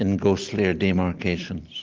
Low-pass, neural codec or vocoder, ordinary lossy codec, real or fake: 7.2 kHz; none; Opus, 16 kbps; real